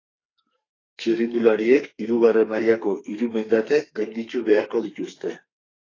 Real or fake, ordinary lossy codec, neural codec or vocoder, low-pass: fake; AAC, 32 kbps; codec, 32 kHz, 1.9 kbps, SNAC; 7.2 kHz